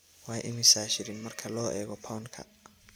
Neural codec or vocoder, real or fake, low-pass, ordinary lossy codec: none; real; none; none